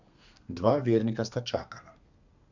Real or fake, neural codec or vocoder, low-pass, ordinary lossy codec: fake; codec, 32 kHz, 1.9 kbps, SNAC; 7.2 kHz; none